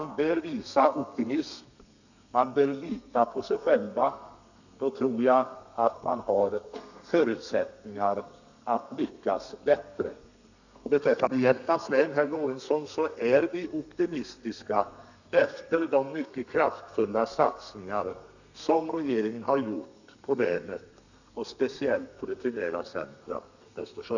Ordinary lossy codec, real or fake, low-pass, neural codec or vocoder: none; fake; 7.2 kHz; codec, 32 kHz, 1.9 kbps, SNAC